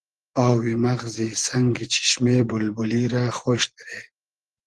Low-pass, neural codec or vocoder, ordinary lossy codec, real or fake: 10.8 kHz; vocoder, 24 kHz, 100 mel bands, Vocos; Opus, 16 kbps; fake